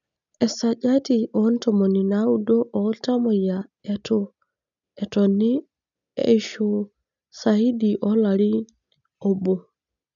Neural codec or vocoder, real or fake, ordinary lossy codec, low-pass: none; real; none; 7.2 kHz